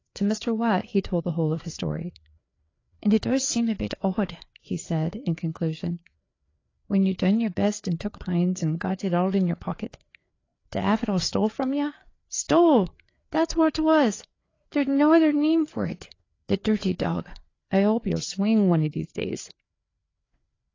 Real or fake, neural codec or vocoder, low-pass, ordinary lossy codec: fake; codec, 16 kHz, 4 kbps, FreqCodec, larger model; 7.2 kHz; AAC, 32 kbps